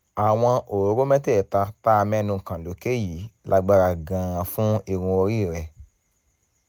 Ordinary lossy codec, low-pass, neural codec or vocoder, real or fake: none; none; none; real